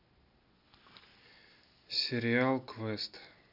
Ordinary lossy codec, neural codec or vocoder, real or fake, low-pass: none; none; real; 5.4 kHz